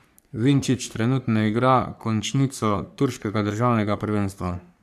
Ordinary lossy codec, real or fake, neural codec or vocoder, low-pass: none; fake; codec, 44.1 kHz, 3.4 kbps, Pupu-Codec; 14.4 kHz